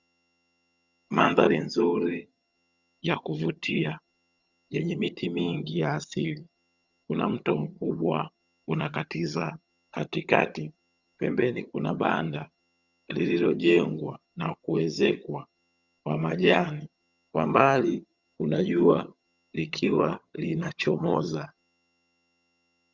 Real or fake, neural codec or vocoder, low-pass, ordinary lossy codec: fake; vocoder, 22.05 kHz, 80 mel bands, HiFi-GAN; 7.2 kHz; Opus, 64 kbps